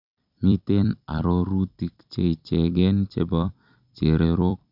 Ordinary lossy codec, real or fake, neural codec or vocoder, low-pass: none; fake; vocoder, 44.1 kHz, 128 mel bands every 256 samples, BigVGAN v2; 5.4 kHz